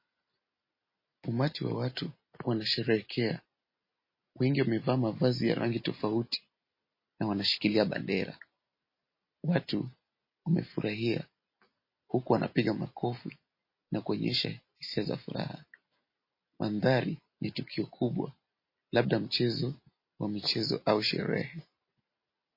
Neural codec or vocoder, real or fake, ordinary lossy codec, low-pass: none; real; MP3, 24 kbps; 5.4 kHz